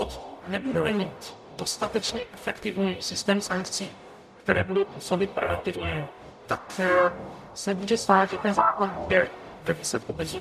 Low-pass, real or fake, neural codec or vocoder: 14.4 kHz; fake; codec, 44.1 kHz, 0.9 kbps, DAC